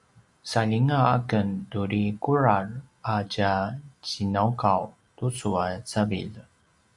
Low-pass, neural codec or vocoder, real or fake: 10.8 kHz; none; real